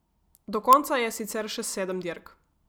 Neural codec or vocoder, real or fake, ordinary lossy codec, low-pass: none; real; none; none